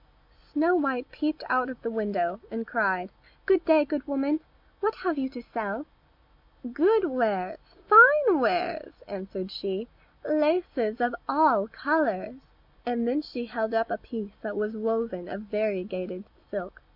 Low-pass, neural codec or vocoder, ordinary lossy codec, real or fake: 5.4 kHz; none; MP3, 48 kbps; real